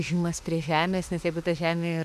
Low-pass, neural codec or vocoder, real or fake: 14.4 kHz; autoencoder, 48 kHz, 32 numbers a frame, DAC-VAE, trained on Japanese speech; fake